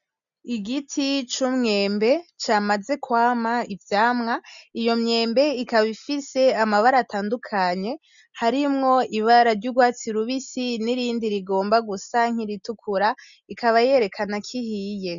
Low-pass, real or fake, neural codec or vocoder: 7.2 kHz; real; none